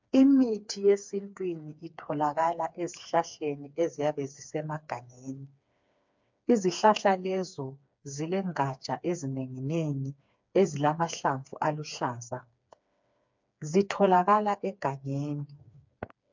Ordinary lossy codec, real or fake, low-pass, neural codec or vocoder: MP3, 64 kbps; fake; 7.2 kHz; codec, 16 kHz, 4 kbps, FreqCodec, smaller model